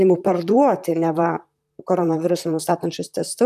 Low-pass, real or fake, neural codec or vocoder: 14.4 kHz; fake; vocoder, 44.1 kHz, 128 mel bands, Pupu-Vocoder